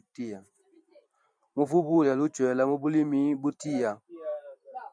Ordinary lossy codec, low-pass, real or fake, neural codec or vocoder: MP3, 96 kbps; 9.9 kHz; fake; vocoder, 44.1 kHz, 128 mel bands every 512 samples, BigVGAN v2